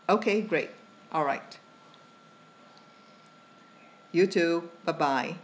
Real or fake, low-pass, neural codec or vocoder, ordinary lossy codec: real; none; none; none